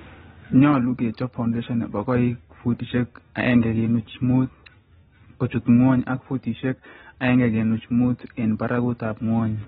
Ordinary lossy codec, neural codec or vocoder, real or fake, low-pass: AAC, 16 kbps; none; real; 7.2 kHz